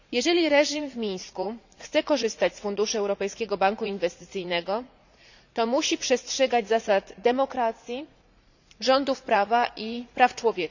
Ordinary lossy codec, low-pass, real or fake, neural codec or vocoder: none; 7.2 kHz; fake; vocoder, 44.1 kHz, 80 mel bands, Vocos